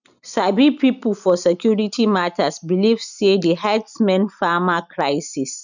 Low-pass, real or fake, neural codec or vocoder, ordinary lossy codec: 7.2 kHz; real; none; none